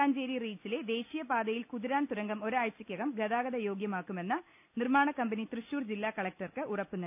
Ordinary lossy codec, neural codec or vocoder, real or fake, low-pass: none; none; real; 3.6 kHz